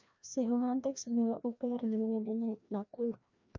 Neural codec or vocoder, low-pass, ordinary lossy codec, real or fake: codec, 16 kHz, 1 kbps, FreqCodec, larger model; 7.2 kHz; none; fake